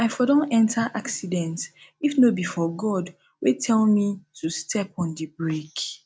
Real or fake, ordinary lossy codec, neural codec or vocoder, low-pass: real; none; none; none